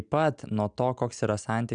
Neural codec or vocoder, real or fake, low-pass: none; real; 9.9 kHz